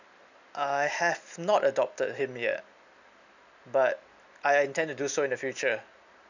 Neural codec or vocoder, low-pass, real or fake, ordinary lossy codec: none; 7.2 kHz; real; none